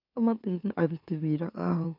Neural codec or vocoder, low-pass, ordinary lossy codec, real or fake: autoencoder, 44.1 kHz, a latent of 192 numbers a frame, MeloTTS; 5.4 kHz; none; fake